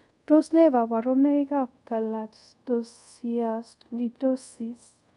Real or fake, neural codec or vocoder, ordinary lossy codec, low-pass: fake; codec, 24 kHz, 0.5 kbps, DualCodec; none; 10.8 kHz